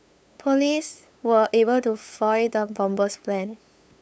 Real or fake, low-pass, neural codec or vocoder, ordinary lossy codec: fake; none; codec, 16 kHz, 8 kbps, FunCodec, trained on LibriTTS, 25 frames a second; none